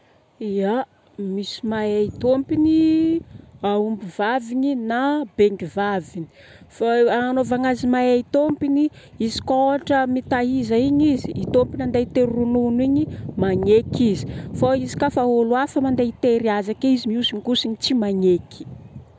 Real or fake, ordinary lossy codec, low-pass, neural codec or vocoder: real; none; none; none